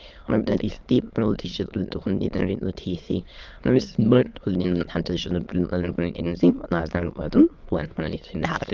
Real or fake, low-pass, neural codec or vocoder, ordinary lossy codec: fake; 7.2 kHz; autoencoder, 22.05 kHz, a latent of 192 numbers a frame, VITS, trained on many speakers; Opus, 32 kbps